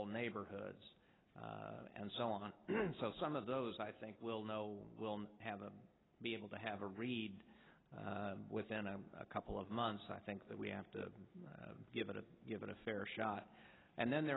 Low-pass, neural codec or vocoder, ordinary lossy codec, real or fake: 7.2 kHz; none; AAC, 16 kbps; real